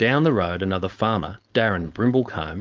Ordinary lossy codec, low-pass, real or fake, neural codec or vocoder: Opus, 24 kbps; 7.2 kHz; fake; codec, 16 kHz, 4.8 kbps, FACodec